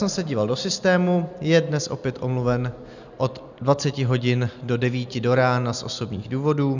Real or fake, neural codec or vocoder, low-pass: real; none; 7.2 kHz